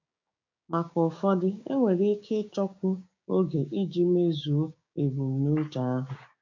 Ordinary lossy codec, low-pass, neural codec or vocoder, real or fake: AAC, 48 kbps; 7.2 kHz; codec, 16 kHz, 6 kbps, DAC; fake